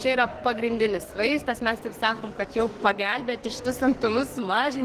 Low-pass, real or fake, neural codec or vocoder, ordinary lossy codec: 14.4 kHz; fake; codec, 44.1 kHz, 2.6 kbps, SNAC; Opus, 16 kbps